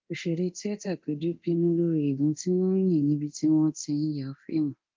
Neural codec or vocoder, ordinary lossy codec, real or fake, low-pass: codec, 24 kHz, 0.9 kbps, DualCodec; Opus, 32 kbps; fake; 7.2 kHz